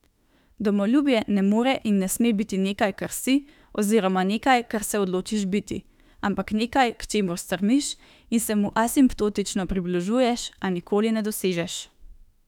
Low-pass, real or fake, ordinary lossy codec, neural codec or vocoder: 19.8 kHz; fake; none; autoencoder, 48 kHz, 32 numbers a frame, DAC-VAE, trained on Japanese speech